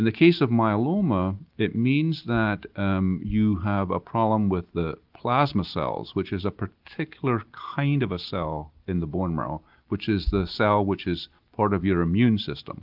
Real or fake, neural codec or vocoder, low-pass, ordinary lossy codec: real; none; 5.4 kHz; Opus, 32 kbps